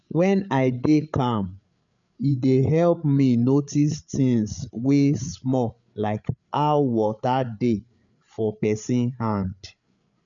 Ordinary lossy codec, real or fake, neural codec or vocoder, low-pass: none; fake; codec, 16 kHz, 8 kbps, FreqCodec, larger model; 7.2 kHz